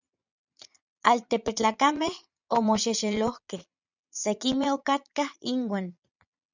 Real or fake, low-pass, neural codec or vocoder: fake; 7.2 kHz; vocoder, 22.05 kHz, 80 mel bands, Vocos